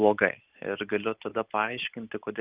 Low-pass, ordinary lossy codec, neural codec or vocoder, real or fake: 3.6 kHz; Opus, 24 kbps; none; real